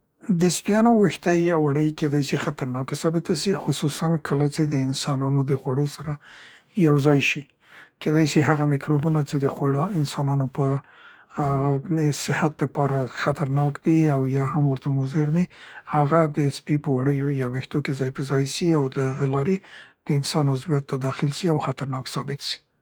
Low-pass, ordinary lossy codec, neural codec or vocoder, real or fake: none; none; codec, 44.1 kHz, 2.6 kbps, DAC; fake